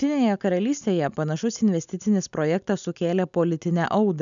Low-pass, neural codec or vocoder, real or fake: 7.2 kHz; none; real